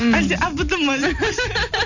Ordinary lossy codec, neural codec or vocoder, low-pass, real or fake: none; none; 7.2 kHz; real